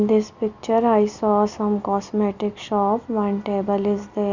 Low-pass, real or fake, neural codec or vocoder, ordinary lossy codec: 7.2 kHz; real; none; Opus, 64 kbps